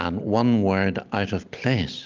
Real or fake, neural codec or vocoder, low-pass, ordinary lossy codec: real; none; 7.2 kHz; Opus, 32 kbps